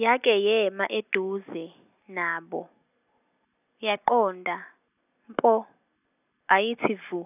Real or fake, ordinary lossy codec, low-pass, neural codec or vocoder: real; none; 3.6 kHz; none